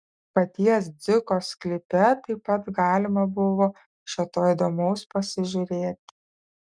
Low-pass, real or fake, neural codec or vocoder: 9.9 kHz; real; none